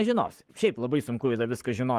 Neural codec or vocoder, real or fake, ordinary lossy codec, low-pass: codec, 44.1 kHz, 7.8 kbps, Pupu-Codec; fake; Opus, 24 kbps; 14.4 kHz